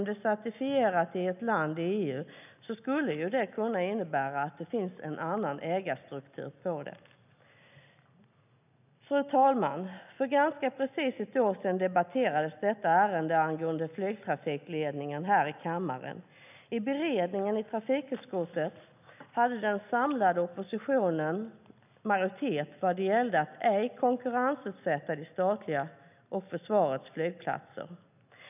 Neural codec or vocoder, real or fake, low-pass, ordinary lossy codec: none; real; 3.6 kHz; none